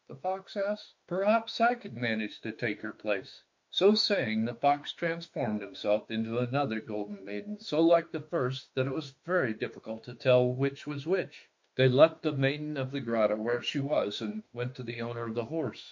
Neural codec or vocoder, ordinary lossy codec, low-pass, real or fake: autoencoder, 48 kHz, 32 numbers a frame, DAC-VAE, trained on Japanese speech; MP3, 48 kbps; 7.2 kHz; fake